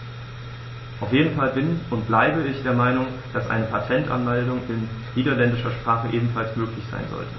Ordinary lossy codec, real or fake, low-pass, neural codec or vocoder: MP3, 24 kbps; real; 7.2 kHz; none